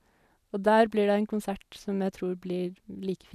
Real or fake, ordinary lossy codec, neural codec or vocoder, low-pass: real; none; none; 14.4 kHz